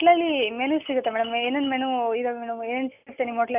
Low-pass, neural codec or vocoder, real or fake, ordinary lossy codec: 3.6 kHz; none; real; none